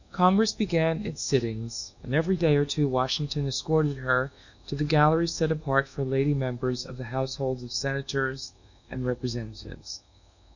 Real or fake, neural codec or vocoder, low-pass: fake; codec, 24 kHz, 1.2 kbps, DualCodec; 7.2 kHz